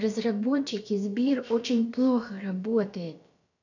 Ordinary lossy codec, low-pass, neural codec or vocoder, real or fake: none; 7.2 kHz; codec, 16 kHz, about 1 kbps, DyCAST, with the encoder's durations; fake